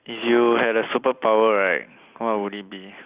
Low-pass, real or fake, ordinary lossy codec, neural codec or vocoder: 3.6 kHz; real; Opus, 64 kbps; none